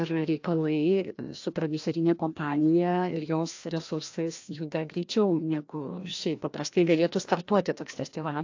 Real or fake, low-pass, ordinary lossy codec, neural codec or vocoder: fake; 7.2 kHz; AAC, 48 kbps; codec, 16 kHz, 1 kbps, FreqCodec, larger model